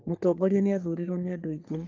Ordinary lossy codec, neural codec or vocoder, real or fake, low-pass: Opus, 32 kbps; codec, 44.1 kHz, 3.4 kbps, Pupu-Codec; fake; 7.2 kHz